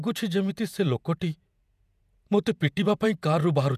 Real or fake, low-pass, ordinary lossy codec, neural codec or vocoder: fake; 14.4 kHz; none; vocoder, 48 kHz, 128 mel bands, Vocos